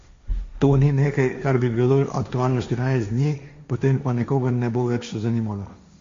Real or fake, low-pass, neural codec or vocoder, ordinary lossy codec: fake; 7.2 kHz; codec, 16 kHz, 1.1 kbps, Voila-Tokenizer; MP3, 48 kbps